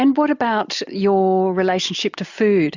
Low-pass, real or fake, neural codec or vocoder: 7.2 kHz; real; none